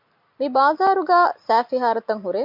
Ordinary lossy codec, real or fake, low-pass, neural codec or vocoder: MP3, 48 kbps; real; 5.4 kHz; none